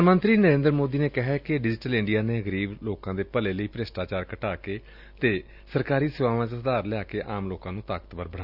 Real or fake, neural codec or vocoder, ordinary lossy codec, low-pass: real; none; Opus, 64 kbps; 5.4 kHz